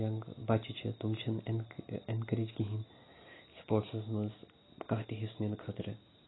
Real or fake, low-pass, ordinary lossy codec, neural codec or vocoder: real; 7.2 kHz; AAC, 16 kbps; none